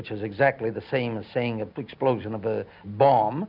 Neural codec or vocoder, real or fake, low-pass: none; real; 5.4 kHz